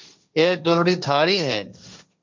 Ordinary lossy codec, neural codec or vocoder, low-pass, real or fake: MP3, 64 kbps; codec, 16 kHz, 1.1 kbps, Voila-Tokenizer; 7.2 kHz; fake